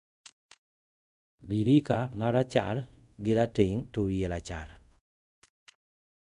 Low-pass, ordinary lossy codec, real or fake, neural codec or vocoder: 10.8 kHz; none; fake; codec, 24 kHz, 0.5 kbps, DualCodec